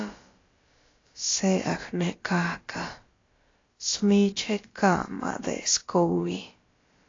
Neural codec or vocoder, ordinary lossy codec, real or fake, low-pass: codec, 16 kHz, about 1 kbps, DyCAST, with the encoder's durations; AAC, 32 kbps; fake; 7.2 kHz